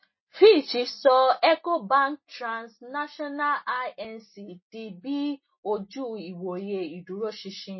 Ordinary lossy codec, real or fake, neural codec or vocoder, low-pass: MP3, 24 kbps; real; none; 7.2 kHz